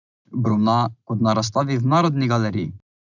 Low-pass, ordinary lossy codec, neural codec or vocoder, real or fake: 7.2 kHz; none; none; real